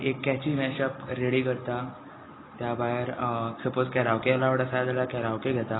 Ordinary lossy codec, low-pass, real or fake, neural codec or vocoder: AAC, 16 kbps; 7.2 kHz; real; none